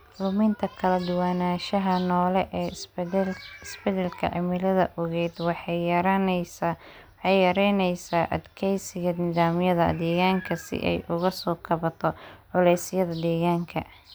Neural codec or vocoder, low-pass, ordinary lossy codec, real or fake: none; none; none; real